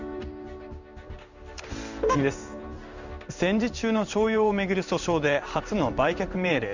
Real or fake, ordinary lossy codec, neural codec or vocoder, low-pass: fake; none; codec, 16 kHz in and 24 kHz out, 1 kbps, XY-Tokenizer; 7.2 kHz